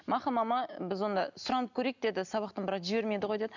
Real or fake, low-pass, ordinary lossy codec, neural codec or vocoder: real; 7.2 kHz; none; none